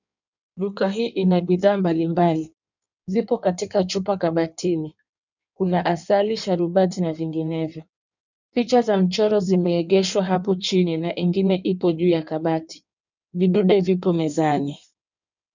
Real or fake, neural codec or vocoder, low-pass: fake; codec, 16 kHz in and 24 kHz out, 1.1 kbps, FireRedTTS-2 codec; 7.2 kHz